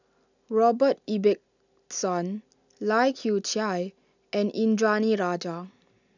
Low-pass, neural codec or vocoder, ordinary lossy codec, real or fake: 7.2 kHz; none; none; real